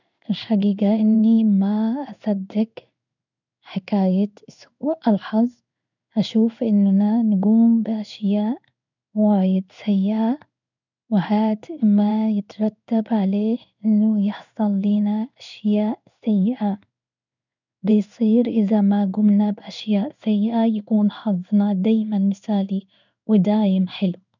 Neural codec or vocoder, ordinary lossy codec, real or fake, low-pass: codec, 16 kHz in and 24 kHz out, 1 kbps, XY-Tokenizer; none; fake; 7.2 kHz